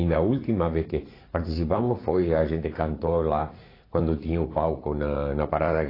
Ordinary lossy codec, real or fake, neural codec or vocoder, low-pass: AAC, 24 kbps; fake; vocoder, 44.1 kHz, 80 mel bands, Vocos; 5.4 kHz